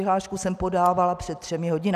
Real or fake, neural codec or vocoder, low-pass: real; none; 14.4 kHz